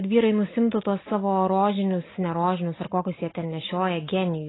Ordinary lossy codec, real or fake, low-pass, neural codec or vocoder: AAC, 16 kbps; real; 7.2 kHz; none